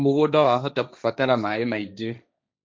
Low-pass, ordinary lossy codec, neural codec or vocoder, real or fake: none; none; codec, 16 kHz, 1.1 kbps, Voila-Tokenizer; fake